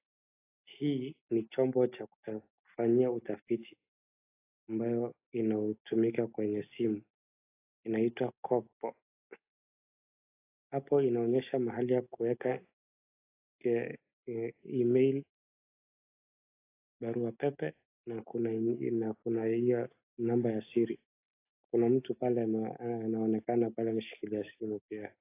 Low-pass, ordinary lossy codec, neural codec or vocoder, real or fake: 3.6 kHz; AAC, 24 kbps; none; real